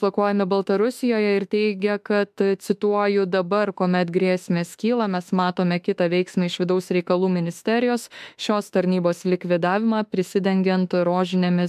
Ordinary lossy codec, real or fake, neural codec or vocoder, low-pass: MP3, 96 kbps; fake; autoencoder, 48 kHz, 32 numbers a frame, DAC-VAE, trained on Japanese speech; 14.4 kHz